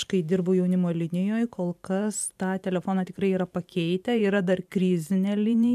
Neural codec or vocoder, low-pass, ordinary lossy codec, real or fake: vocoder, 44.1 kHz, 128 mel bands every 512 samples, BigVGAN v2; 14.4 kHz; MP3, 96 kbps; fake